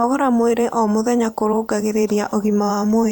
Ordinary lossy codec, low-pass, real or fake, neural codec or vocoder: none; none; fake; vocoder, 44.1 kHz, 128 mel bands every 512 samples, BigVGAN v2